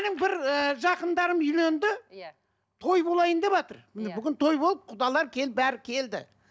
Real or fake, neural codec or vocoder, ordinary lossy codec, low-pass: real; none; none; none